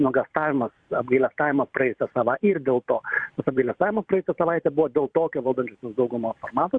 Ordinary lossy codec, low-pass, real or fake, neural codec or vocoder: Opus, 64 kbps; 9.9 kHz; fake; vocoder, 44.1 kHz, 128 mel bands every 512 samples, BigVGAN v2